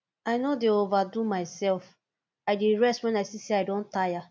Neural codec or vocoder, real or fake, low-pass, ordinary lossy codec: none; real; none; none